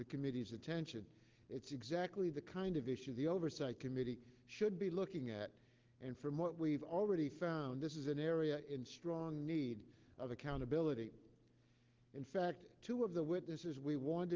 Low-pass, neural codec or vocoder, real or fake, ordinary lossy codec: 7.2 kHz; none; real; Opus, 16 kbps